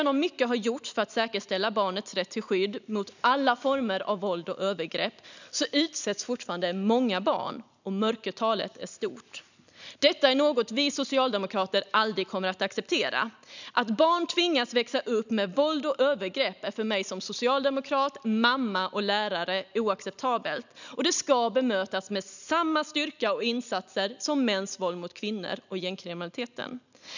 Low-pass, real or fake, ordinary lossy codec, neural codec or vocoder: 7.2 kHz; real; none; none